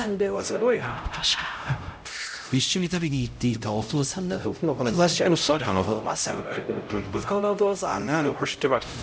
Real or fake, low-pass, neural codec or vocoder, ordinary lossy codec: fake; none; codec, 16 kHz, 0.5 kbps, X-Codec, HuBERT features, trained on LibriSpeech; none